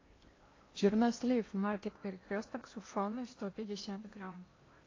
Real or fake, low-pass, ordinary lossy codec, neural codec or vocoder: fake; 7.2 kHz; AAC, 32 kbps; codec, 16 kHz in and 24 kHz out, 0.8 kbps, FocalCodec, streaming, 65536 codes